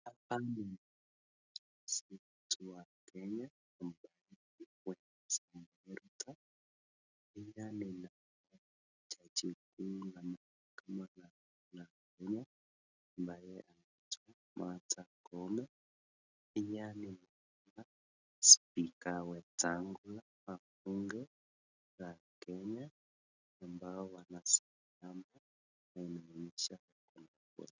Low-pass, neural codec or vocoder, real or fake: 7.2 kHz; none; real